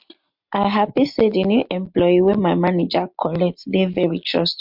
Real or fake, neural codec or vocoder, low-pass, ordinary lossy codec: real; none; 5.4 kHz; MP3, 48 kbps